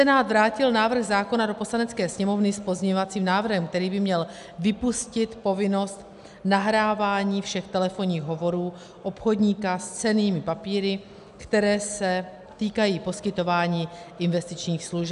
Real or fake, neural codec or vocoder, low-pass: real; none; 10.8 kHz